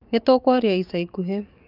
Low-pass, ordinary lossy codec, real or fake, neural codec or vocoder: 5.4 kHz; none; fake; codec, 44.1 kHz, 7.8 kbps, DAC